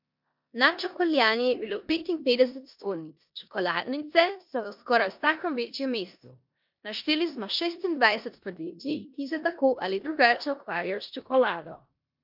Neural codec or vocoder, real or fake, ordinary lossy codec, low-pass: codec, 16 kHz in and 24 kHz out, 0.9 kbps, LongCat-Audio-Codec, four codebook decoder; fake; MP3, 48 kbps; 5.4 kHz